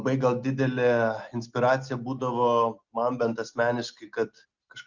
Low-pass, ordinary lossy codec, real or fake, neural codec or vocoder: 7.2 kHz; Opus, 64 kbps; real; none